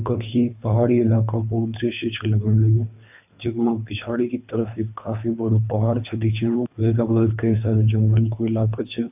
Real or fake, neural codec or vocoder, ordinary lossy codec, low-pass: fake; codec, 24 kHz, 3 kbps, HILCodec; AAC, 32 kbps; 3.6 kHz